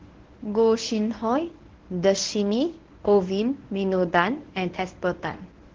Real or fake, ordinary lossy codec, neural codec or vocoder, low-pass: fake; Opus, 16 kbps; codec, 24 kHz, 0.9 kbps, WavTokenizer, medium speech release version 1; 7.2 kHz